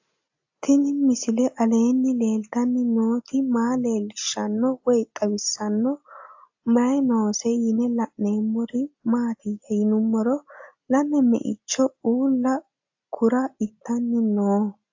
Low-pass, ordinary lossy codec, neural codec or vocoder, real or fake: 7.2 kHz; AAC, 48 kbps; none; real